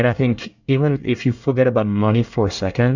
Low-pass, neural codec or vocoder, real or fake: 7.2 kHz; codec, 24 kHz, 1 kbps, SNAC; fake